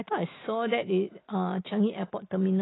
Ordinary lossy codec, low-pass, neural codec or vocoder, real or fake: AAC, 16 kbps; 7.2 kHz; none; real